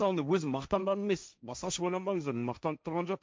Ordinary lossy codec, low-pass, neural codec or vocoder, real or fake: none; none; codec, 16 kHz, 1.1 kbps, Voila-Tokenizer; fake